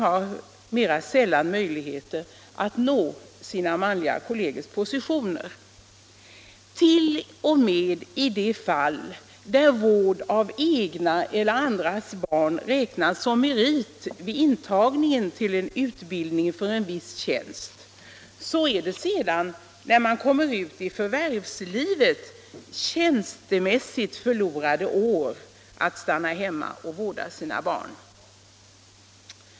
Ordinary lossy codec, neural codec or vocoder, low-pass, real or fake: none; none; none; real